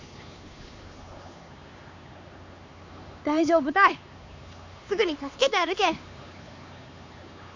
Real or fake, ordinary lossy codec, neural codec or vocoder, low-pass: fake; MP3, 48 kbps; codec, 16 kHz, 4 kbps, X-Codec, HuBERT features, trained on LibriSpeech; 7.2 kHz